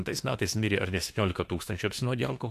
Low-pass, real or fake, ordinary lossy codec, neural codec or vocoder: 14.4 kHz; fake; AAC, 64 kbps; autoencoder, 48 kHz, 32 numbers a frame, DAC-VAE, trained on Japanese speech